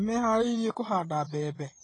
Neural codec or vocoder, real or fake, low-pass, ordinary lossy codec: vocoder, 24 kHz, 100 mel bands, Vocos; fake; 10.8 kHz; AAC, 32 kbps